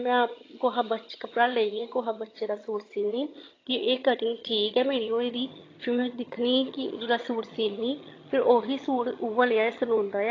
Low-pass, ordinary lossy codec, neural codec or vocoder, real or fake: 7.2 kHz; AAC, 32 kbps; vocoder, 22.05 kHz, 80 mel bands, HiFi-GAN; fake